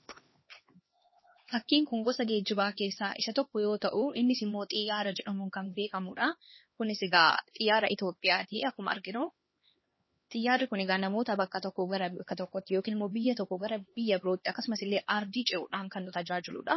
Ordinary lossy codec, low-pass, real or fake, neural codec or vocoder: MP3, 24 kbps; 7.2 kHz; fake; codec, 16 kHz, 2 kbps, X-Codec, HuBERT features, trained on LibriSpeech